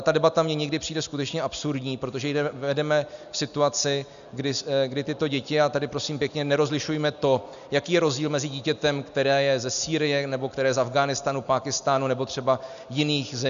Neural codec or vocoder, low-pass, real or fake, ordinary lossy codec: none; 7.2 kHz; real; MP3, 96 kbps